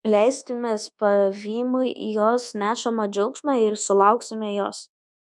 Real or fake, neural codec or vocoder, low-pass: fake; codec, 24 kHz, 1.2 kbps, DualCodec; 10.8 kHz